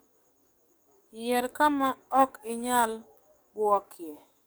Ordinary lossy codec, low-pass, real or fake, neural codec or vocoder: none; none; fake; codec, 44.1 kHz, 7.8 kbps, DAC